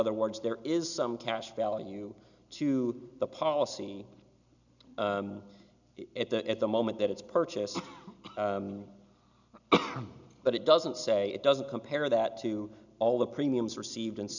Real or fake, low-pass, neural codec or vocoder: real; 7.2 kHz; none